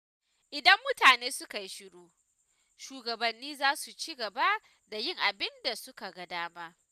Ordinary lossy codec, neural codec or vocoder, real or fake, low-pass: none; none; real; 14.4 kHz